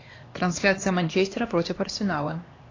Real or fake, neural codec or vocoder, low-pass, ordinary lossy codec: fake; codec, 16 kHz, 2 kbps, X-Codec, HuBERT features, trained on LibriSpeech; 7.2 kHz; AAC, 32 kbps